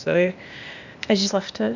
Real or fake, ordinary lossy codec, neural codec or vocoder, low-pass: fake; Opus, 64 kbps; codec, 16 kHz, 0.8 kbps, ZipCodec; 7.2 kHz